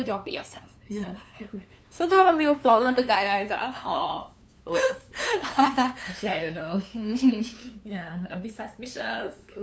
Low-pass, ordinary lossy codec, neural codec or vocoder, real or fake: none; none; codec, 16 kHz, 2 kbps, FunCodec, trained on LibriTTS, 25 frames a second; fake